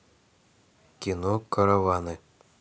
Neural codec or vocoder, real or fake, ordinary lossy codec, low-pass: none; real; none; none